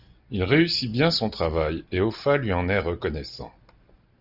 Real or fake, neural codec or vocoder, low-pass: real; none; 5.4 kHz